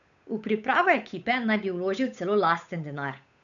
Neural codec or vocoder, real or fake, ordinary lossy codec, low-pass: codec, 16 kHz, 8 kbps, FunCodec, trained on Chinese and English, 25 frames a second; fake; none; 7.2 kHz